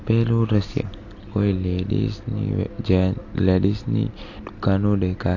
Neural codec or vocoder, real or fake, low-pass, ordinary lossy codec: none; real; 7.2 kHz; AAC, 48 kbps